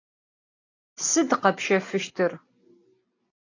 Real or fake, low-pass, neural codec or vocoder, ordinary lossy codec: real; 7.2 kHz; none; AAC, 48 kbps